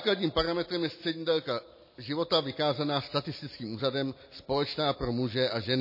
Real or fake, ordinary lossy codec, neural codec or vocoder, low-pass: real; MP3, 24 kbps; none; 5.4 kHz